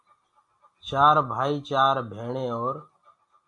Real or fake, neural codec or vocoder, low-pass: real; none; 10.8 kHz